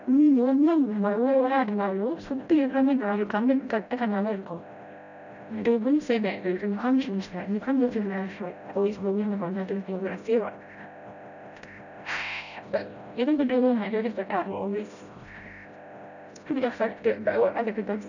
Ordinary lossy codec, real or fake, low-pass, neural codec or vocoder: none; fake; 7.2 kHz; codec, 16 kHz, 0.5 kbps, FreqCodec, smaller model